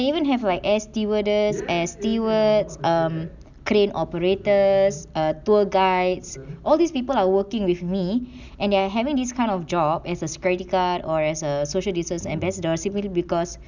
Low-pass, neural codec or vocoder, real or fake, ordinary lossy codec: 7.2 kHz; none; real; none